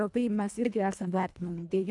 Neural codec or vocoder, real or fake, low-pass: codec, 24 kHz, 1.5 kbps, HILCodec; fake; 10.8 kHz